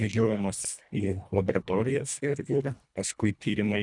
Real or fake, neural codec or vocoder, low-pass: fake; codec, 24 kHz, 1.5 kbps, HILCodec; 10.8 kHz